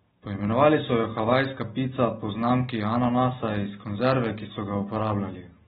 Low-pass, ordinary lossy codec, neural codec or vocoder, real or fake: 19.8 kHz; AAC, 16 kbps; none; real